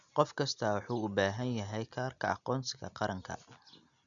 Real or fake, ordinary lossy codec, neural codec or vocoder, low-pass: real; none; none; 7.2 kHz